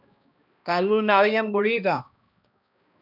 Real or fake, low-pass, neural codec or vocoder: fake; 5.4 kHz; codec, 16 kHz, 1 kbps, X-Codec, HuBERT features, trained on balanced general audio